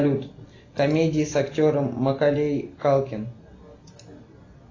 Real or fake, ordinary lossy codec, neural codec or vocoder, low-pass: real; AAC, 32 kbps; none; 7.2 kHz